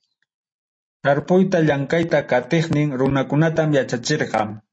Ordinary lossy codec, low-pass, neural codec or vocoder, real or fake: MP3, 32 kbps; 9.9 kHz; none; real